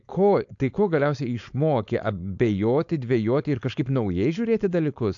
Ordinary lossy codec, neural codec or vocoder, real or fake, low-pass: AAC, 64 kbps; codec, 16 kHz, 4.8 kbps, FACodec; fake; 7.2 kHz